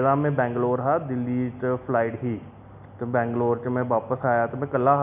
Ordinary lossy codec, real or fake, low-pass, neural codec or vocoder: none; real; 3.6 kHz; none